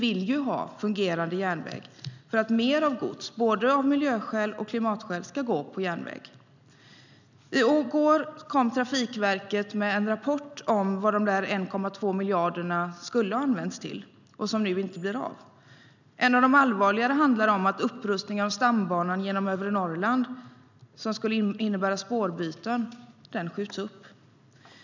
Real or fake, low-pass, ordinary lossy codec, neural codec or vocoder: real; 7.2 kHz; none; none